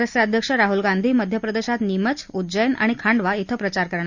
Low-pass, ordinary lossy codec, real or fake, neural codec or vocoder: 7.2 kHz; Opus, 64 kbps; real; none